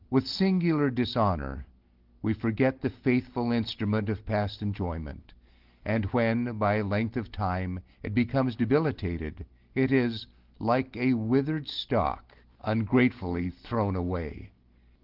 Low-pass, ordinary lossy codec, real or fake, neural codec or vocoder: 5.4 kHz; Opus, 16 kbps; real; none